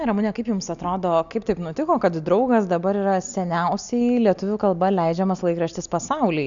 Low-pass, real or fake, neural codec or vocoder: 7.2 kHz; real; none